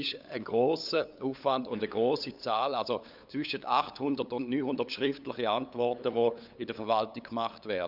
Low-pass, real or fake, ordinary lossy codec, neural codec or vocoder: 5.4 kHz; fake; none; codec, 16 kHz, 8 kbps, FunCodec, trained on LibriTTS, 25 frames a second